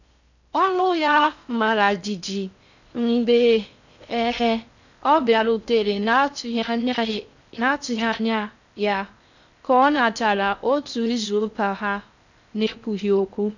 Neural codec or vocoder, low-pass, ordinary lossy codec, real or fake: codec, 16 kHz in and 24 kHz out, 0.6 kbps, FocalCodec, streaming, 4096 codes; 7.2 kHz; none; fake